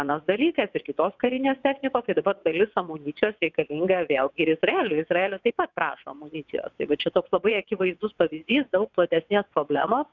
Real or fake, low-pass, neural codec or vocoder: fake; 7.2 kHz; vocoder, 22.05 kHz, 80 mel bands, WaveNeXt